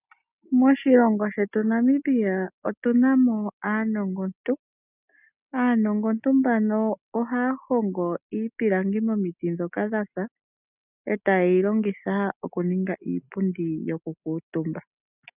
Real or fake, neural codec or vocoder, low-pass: real; none; 3.6 kHz